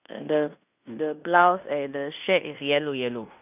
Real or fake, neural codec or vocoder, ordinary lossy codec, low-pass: fake; codec, 16 kHz in and 24 kHz out, 0.9 kbps, LongCat-Audio-Codec, fine tuned four codebook decoder; none; 3.6 kHz